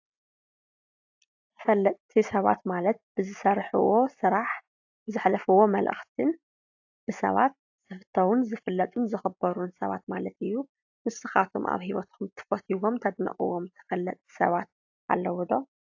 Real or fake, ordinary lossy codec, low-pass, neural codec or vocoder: real; AAC, 48 kbps; 7.2 kHz; none